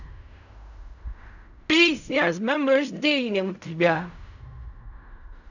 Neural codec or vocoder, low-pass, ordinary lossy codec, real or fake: codec, 16 kHz in and 24 kHz out, 0.4 kbps, LongCat-Audio-Codec, fine tuned four codebook decoder; 7.2 kHz; none; fake